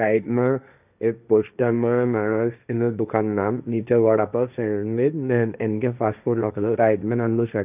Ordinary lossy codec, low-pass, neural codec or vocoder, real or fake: none; 3.6 kHz; codec, 16 kHz, 1.1 kbps, Voila-Tokenizer; fake